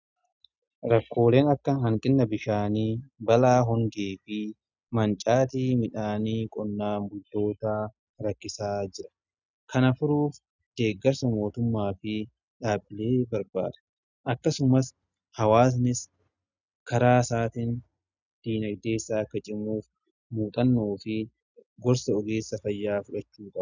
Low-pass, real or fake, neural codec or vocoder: 7.2 kHz; real; none